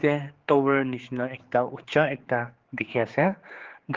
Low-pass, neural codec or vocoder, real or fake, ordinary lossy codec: 7.2 kHz; codec, 16 kHz, 4 kbps, X-Codec, HuBERT features, trained on general audio; fake; Opus, 16 kbps